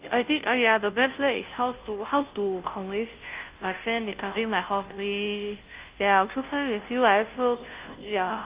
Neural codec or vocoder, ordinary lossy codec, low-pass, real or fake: codec, 16 kHz, 0.5 kbps, FunCodec, trained on Chinese and English, 25 frames a second; Opus, 32 kbps; 3.6 kHz; fake